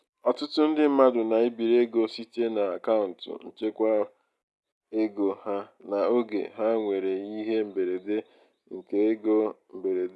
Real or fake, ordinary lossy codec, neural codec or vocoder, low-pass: real; none; none; none